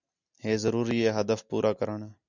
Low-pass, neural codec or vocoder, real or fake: 7.2 kHz; none; real